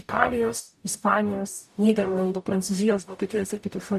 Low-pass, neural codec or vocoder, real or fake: 14.4 kHz; codec, 44.1 kHz, 0.9 kbps, DAC; fake